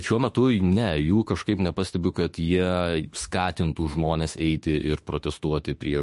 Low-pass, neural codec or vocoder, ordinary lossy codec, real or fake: 14.4 kHz; autoencoder, 48 kHz, 32 numbers a frame, DAC-VAE, trained on Japanese speech; MP3, 48 kbps; fake